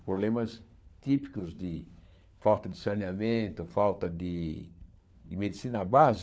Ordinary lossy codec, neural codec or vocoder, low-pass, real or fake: none; codec, 16 kHz, 4 kbps, FunCodec, trained on LibriTTS, 50 frames a second; none; fake